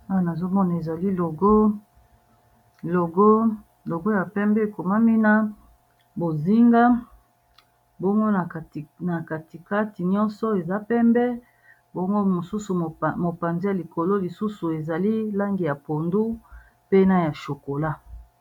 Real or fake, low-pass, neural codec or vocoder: real; 19.8 kHz; none